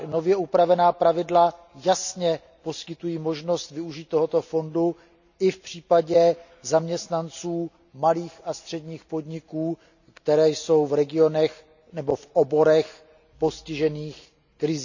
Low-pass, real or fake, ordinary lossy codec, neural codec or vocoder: 7.2 kHz; real; none; none